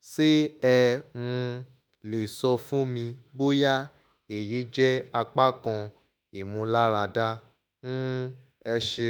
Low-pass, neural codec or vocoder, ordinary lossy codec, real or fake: none; autoencoder, 48 kHz, 32 numbers a frame, DAC-VAE, trained on Japanese speech; none; fake